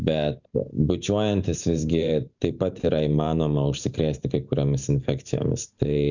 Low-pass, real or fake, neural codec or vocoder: 7.2 kHz; fake; vocoder, 44.1 kHz, 128 mel bands every 512 samples, BigVGAN v2